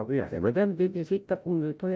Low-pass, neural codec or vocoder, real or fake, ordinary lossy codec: none; codec, 16 kHz, 0.5 kbps, FreqCodec, larger model; fake; none